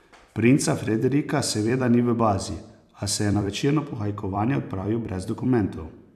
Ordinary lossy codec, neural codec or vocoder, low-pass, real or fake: none; vocoder, 44.1 kHz, 128 mel bands every 256 samples, BigVGAN v2; 14.4 kHz; fake